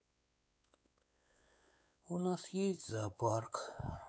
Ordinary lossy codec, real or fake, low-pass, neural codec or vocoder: none; fake; none; codec, 16 kHz, 4 kbps, X-Codec, WavLM features, trained on Multilingual LibriSpeech